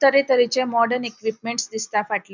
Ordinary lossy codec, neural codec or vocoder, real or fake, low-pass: none; none; real; 7.2 kHz